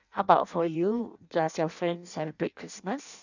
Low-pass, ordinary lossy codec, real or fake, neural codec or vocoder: 7.2 kHz; none; fake; codec, 16 kHz in and 24 kHz out, 0.6 kbps, FireRedTTS-2 codec